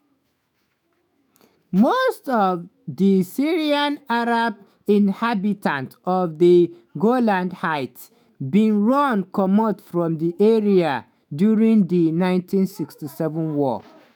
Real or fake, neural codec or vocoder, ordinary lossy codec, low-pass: fake; autoencoder, 48 kHz, 128 numbers a frame, DAC-VAE, trained on Japanese speech; none; 19.8 kHz